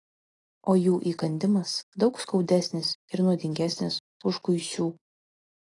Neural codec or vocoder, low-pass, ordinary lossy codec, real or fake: none; 10.8 kHz; AAC, 32 kbps; real